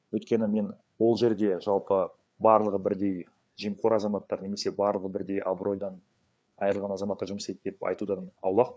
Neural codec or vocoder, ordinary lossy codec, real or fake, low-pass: codec, 16 kHz, 4 kbps, FreqCodec, larger model; none; fake; none